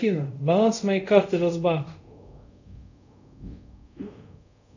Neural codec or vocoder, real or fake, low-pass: codec, 24 kHz, 0.5 kbps, DualCodec; fake; 7.2 kHz